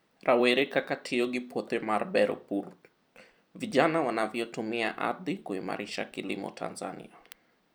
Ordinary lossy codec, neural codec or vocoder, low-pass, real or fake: none; vocoder, 44.1 kHz, 128 mel bands every 256 samples, BigVGAN v2; none; fake